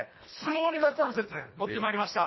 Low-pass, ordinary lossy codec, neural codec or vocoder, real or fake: 7.2 kHz; MP3, 24 kbps; codec, 24 kHz, 1.5 kbps, HILCodec; fake